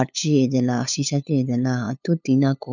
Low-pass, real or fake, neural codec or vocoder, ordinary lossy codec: 7.2 kHz; fake; codec, 16 kHz, 8 kbps, FunCodec, trained on LibriTTS, 25 frames a second; none